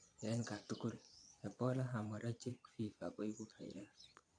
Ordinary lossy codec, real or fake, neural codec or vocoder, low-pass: AAC, 48 kbps; fake; vocoder, 22.05 kHz, 80 mel bands, Vocos; 9.9 kHz